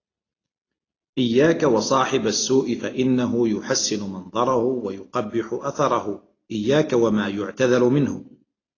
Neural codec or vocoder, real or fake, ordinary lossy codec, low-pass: none; real; AAC, 32 kbps; 7.2 kHz